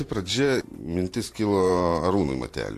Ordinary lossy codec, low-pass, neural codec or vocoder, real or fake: AAC, 64 kbps; 14.4 kHz; vocoder, 44.1 kHz, 128 mel bands every 512 samples, BigVGAN v2; fake